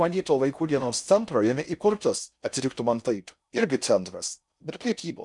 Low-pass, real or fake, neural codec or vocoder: 10.8 kHz; fake; codec, 16 kHz in and 24 kHz out, 0.6 kbps, FocalCodec, streaming, 4096 codes